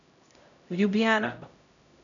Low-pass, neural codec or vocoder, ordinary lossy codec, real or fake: 7.2 kHz; codec, 16 kHz, 0.5 kbps, X-Codec, HuBERT features, trained on LibriSpeech; none; fake